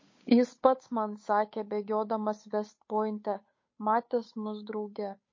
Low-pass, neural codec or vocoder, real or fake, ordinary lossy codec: 7.2 kHz; codec, 16 kHz, 8 kbps, FunCodec, trained on Chinese and English, 25 frames a second; fake; MP3, 32 kbps